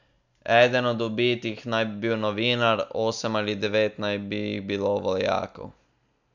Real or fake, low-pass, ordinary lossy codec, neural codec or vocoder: real; 7.2 kHz; none; none